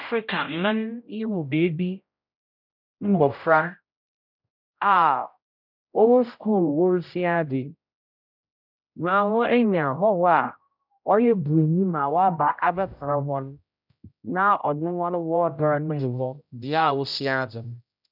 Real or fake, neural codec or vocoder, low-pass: fake; codec, 16 kHz, 0.5 kbps, X-Codec, HuBERT features, trained on general audio; 5.4 kHz